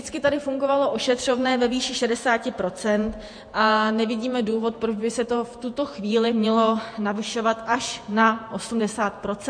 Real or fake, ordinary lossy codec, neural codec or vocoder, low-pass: fake; MP3, 48 kbps; vocoder, 48 kHz, 128 mel bands, Vocos; 9.9 kHz